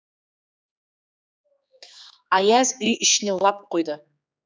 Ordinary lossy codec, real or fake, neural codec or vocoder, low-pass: none; fake; codec, 16 kHz, 4 kbps, X-Codec, HuBERT features, trained on general audio; none